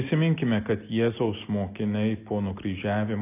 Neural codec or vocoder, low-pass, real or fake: none; 3.6 kHz; real